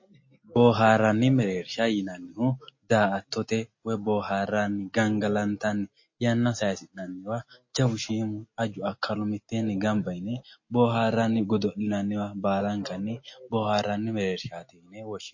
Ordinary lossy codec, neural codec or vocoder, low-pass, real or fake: MP3, 32 kbps; none; 7.2 kHz; real